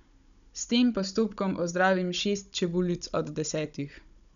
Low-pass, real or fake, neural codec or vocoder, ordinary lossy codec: 7.2 kHz; fake; codec, 16 kHz, 16 kbps, FunCodec, trained on Chinese and English, 50 frames a second; none